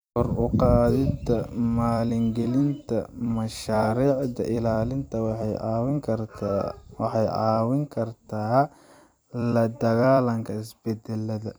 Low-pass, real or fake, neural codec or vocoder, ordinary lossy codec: none; fake; vocoder, 44.1 kHz, 128 mel bands every 256 samples, BigVGAN v2; none